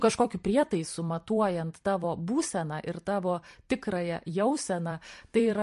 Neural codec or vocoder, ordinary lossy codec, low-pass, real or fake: vocoder, 44.1 kHz, 128 mel bands every 256 samples, BigVGAN v2; MP3, 48 kbps; 14.4 kHz; fake